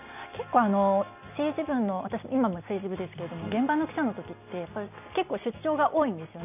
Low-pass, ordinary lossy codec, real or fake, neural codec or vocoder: 3.6 kHz; none; real; none